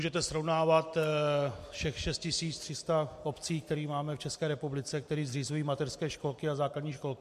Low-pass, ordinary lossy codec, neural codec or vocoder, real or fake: 14.4 kHz; MP3, 64 kbps; none; real